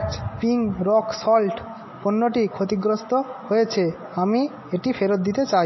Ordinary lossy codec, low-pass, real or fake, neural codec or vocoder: MP3, 24 kbps; 7.2 kHz; real; none